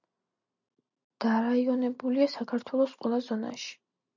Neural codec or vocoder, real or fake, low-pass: none; real; 7.2 kHz